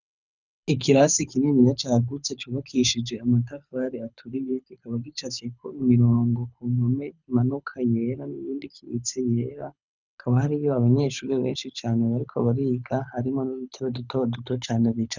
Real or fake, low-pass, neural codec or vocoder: fake; 7.2 kHz; codec, 24 kHz, 6 kbps, HILCodec